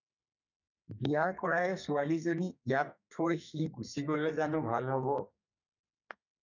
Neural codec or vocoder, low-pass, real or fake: codec, 32 kHz, 1.9 kbps, SNAC; 7.2 kHz; fake